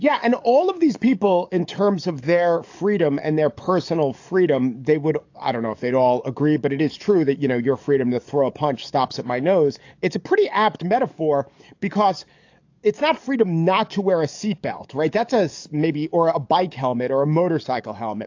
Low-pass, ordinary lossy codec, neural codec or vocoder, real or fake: 7.2 kHz; AAC, 48 kbps; codec, 44.1 kHz, 7.8 kbps, DAC; fake